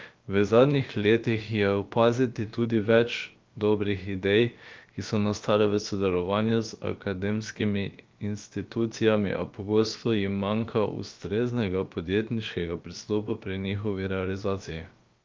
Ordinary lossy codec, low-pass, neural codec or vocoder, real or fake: Opus, 24 kbps; 7.2 kHz; codec, 16 kHz, about 1 kbps, DyCAST, with the encoder's durations; fake